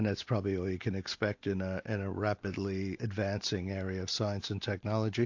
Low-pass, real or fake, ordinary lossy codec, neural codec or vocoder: 7.2 kHz; real; AAC, 48 kbps; none